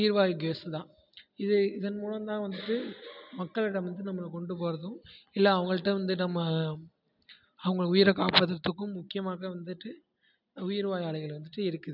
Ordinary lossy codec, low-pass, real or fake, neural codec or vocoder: none; 5.4 kHz; real; none